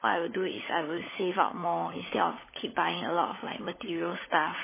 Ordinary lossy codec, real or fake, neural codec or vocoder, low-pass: MP3, 16 kbps; fake; codec, 16 kHz, 16 kbps, FunCodec, trained on LibriTTS, 50 frames a second; 3.6 kHz